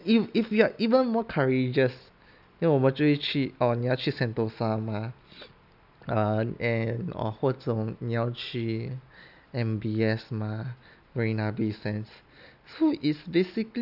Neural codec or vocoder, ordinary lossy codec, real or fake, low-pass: none; none; real; 5.4 kHz